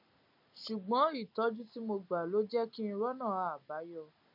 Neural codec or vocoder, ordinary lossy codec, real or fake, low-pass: none; MP3, 48 kbps; real; 5.4 kHz